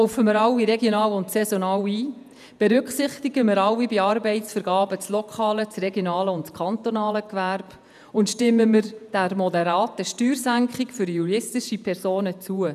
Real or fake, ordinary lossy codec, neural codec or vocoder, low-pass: fake; none; vocoder, 48 kHz, 128 mel bands, Vocos; 14.4 kHz